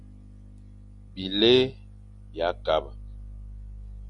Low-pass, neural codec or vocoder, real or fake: 10.8 kHz; none; real